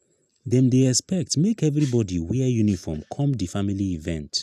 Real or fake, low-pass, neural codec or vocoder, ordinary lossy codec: real; 14.4 kHz; none; none